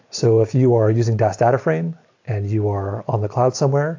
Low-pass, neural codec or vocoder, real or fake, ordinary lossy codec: 7.2 kHz; none; real; AAC, 48 kbps